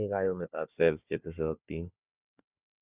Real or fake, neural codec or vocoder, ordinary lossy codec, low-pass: fake; codec, 16 kHz, 4 kbps, FunCodec, trained on Chinese and English, 50 frames a second; Opus, 64 kbps; 3.6 kHz